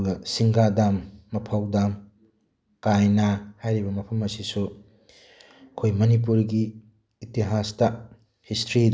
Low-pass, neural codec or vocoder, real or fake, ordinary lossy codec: none; none; real; none